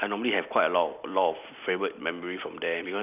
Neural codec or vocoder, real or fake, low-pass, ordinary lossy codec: none; real; 3.6 kHz; none